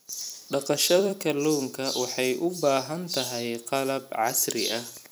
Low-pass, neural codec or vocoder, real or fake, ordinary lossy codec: none; vocoder, 44.1 kHz, 128 mel bands every 256 samples, BigVGAN v2; fake; none